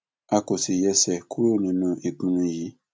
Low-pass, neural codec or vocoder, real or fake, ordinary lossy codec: none; none; real; none